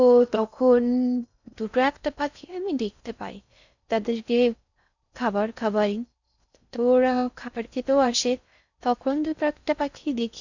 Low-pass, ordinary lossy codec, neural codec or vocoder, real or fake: 7.2 kHz; none; codec, 16 kHz in and 24 kHz out, 0.6 kbps, FocalCodec, streaming, 4096 codes; fake